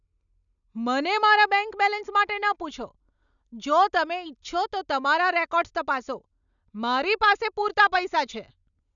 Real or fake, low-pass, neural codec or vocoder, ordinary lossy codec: real; 7.2 kHz; none; none